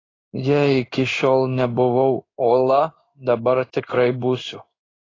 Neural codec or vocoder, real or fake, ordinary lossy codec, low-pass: codec, 16 kHz in and 24 kHz out, 1 kbps, XY-Tokenizer; fake; AAC, 32 kbps; 7.2 kHz